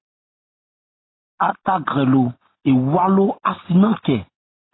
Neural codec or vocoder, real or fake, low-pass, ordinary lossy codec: none; real; 7.2 kHz; AAC, 16 kbps